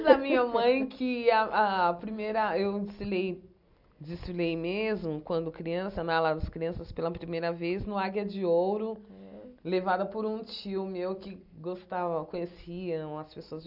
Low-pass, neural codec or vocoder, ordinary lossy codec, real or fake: 5.4 kHz; none; none; real